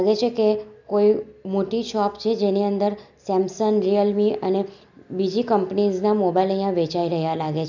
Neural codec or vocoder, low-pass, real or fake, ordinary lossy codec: none; 7.2 kHz; real; none